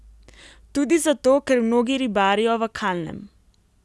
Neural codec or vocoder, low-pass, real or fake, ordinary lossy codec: none; none; real; none